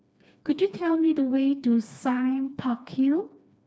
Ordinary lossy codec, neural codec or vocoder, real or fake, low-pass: none; codec, 16 kHz, 2 kbps, FreqCodec, smaller model; fake; none